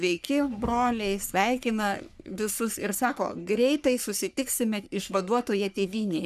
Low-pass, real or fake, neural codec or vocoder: 14.4 kHz; fake; codec, 44.1 kHz, 3.4 kbps, Pupu-Codec